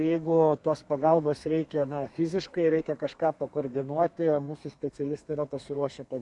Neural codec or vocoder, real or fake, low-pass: codec, 32 kHz, 1.9 kbps, SNAC; fake; 10.8 kHz